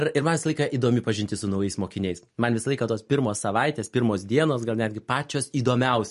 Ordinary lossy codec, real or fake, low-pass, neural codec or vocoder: MP3, 48 kbps; real; 14.4 kHz; none